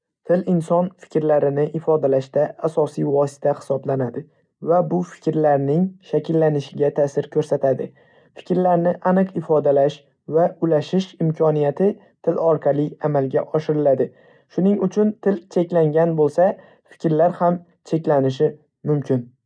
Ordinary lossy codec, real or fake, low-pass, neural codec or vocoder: none; real; 9.9 kHz; none